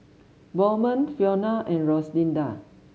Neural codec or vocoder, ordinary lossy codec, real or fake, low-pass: none; none; real; none